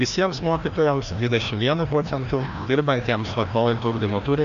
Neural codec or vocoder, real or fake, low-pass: codec, 16 kHz, 1 kbps, FreqCodec, larger model; fake; 7.2 kHz